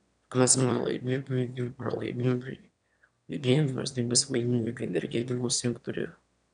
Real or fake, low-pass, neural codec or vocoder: fake; 9.9 kHz; autoencoder, 22.05 kHz, a latent of 192 numbers a frame, VITS, trained on one speaker